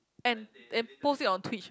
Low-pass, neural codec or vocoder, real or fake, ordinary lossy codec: none; none; real; none